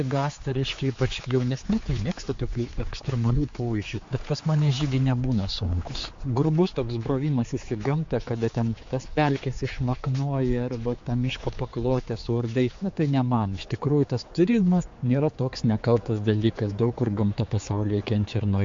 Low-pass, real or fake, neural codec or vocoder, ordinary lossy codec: 7.2 kHz; fake; codec, 16 kHz, 4 kbps, X-Codec, HuBERT features, trained on general audio; MP3, 48 kbps